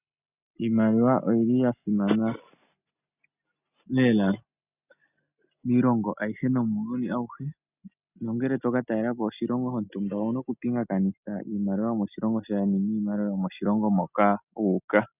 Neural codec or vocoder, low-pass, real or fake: none; 3.6 kHz; real